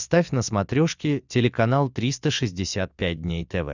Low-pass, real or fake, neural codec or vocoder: 7.2 kHz; real; none